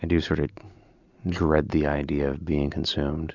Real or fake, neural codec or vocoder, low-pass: real; none; 7.2 kHz